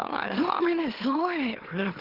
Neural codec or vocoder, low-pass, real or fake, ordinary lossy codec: autoencoder, 44.1 kHz, a latent of 192 numbers a frame, MeloTTS; 5.4 kHz; fake; Opus, 16 kbps